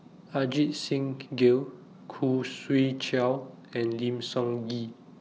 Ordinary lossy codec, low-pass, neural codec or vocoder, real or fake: none; none; none; real